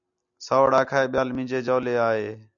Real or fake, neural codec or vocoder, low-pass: real; none; 7.2 kHz